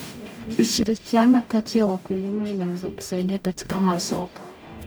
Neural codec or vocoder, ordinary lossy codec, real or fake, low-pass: codec, 44.1 kHz, 0.9 kbps, DAC; none; fake; none